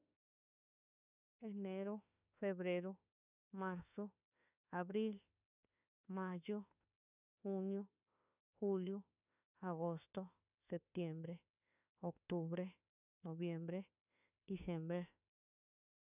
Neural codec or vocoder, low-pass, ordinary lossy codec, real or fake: autoencoder, 48 kHz, 32 numbers a frame, DAC-VAE, trained on Japanese speech; 3.6 kHz; none; fake